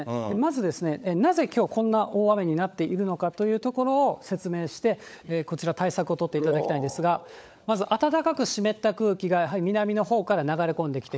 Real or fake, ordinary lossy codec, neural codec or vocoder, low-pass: fake; none; codec, 16 kHz, 16 kbps, FunCodec, trained on LibriTTS, 50 frames a second; none